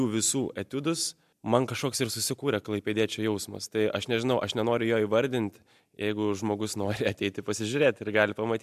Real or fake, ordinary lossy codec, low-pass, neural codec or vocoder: real; MP3, 96 kbps; 14.4 kHz; none